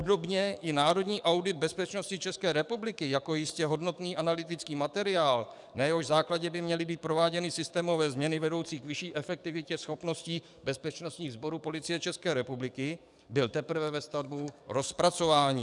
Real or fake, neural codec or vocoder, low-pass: fake; codec, 44.1 kHz, 7.8 kbps, DAC; 10.8 kHz